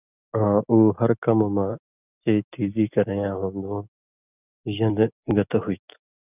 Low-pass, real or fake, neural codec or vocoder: 3.6 kHz; real; none